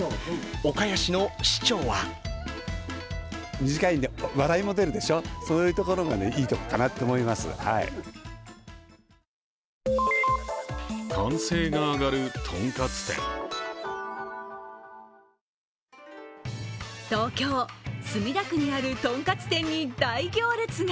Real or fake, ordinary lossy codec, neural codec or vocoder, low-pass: real; none; none; none